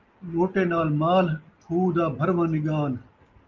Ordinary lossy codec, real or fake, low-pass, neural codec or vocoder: Opus, 24 kbps; real; 7.2 kHz; none